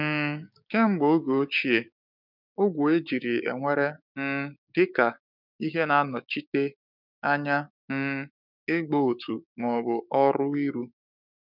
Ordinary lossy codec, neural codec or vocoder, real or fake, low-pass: none; codec, 16 kHz, 6 kbps, DAC; fake; 5.4 kHz